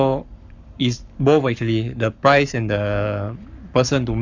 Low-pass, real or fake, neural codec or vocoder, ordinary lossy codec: 7.2 kHz; fake; codec, 44.1 kHz, 7.8 kbps, Pupu-Codec; none